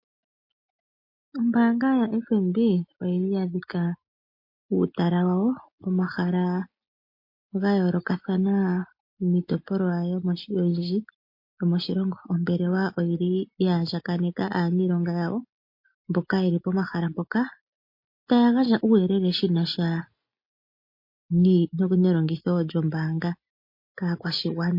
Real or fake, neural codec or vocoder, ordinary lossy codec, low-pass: real; none; MP3, 32 kbps; 5.4 kHz